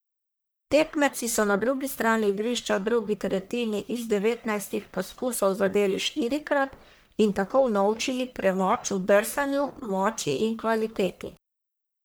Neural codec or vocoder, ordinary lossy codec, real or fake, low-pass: codec, 44.1 kHz, 1.7 kbps, Pupu-Codec; none; fake; none